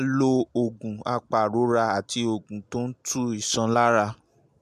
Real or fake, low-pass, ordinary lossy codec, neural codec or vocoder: real; 14.4 kHz; MP3, 96 kbps; none